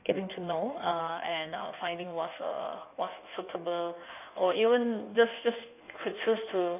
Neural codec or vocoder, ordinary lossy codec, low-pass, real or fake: codec, 16 kHz in and 24 kHz out, 1.1 kbps, FireRedTTS-2 codec; none; 3.6 kHz; fake